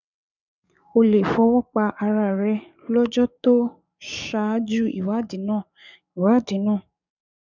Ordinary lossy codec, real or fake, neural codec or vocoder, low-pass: none; fake; codec, 44.1 kHz, 7.8 kbps, DAC; 7.2 kHz